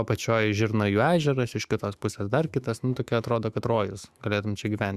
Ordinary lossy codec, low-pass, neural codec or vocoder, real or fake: Opus, 64 kbps; 14.4 kHz; autoencoder, 48 kHz, 128 numbers a frame, DAC-VAE, trained on Japanese speech; fake